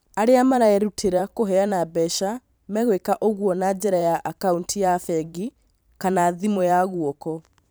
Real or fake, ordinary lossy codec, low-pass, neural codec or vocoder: fake; none; none; vocoder, 44.1 kHz, 128 mel bands every 512 samples, BigVGAN v2